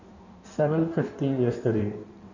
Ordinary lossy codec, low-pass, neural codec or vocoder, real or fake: none; 7.2 kHz; codec, 16 kHz in and 24 kHz out, 1.1 kbps, FireRedTTS-2 codec; fake